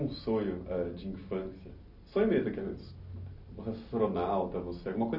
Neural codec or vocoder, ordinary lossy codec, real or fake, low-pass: none; none; real; 5.4 kHz